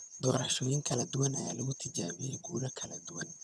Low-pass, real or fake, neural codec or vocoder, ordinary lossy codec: none; fake; vocoder, 22.05 kHz, 80 mel bands, HiFi-GAN; none